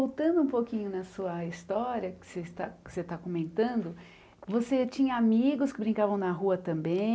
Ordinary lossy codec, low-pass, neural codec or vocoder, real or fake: none; none; none; real